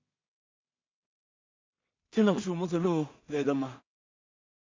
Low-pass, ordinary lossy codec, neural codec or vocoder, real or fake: 7.2 kHz; MP3, 48 kbps; codec, 16 kHz in and 24 kHz out, 0.4 kbps, LongCat-Audio-Codec, two codebook decoder; fake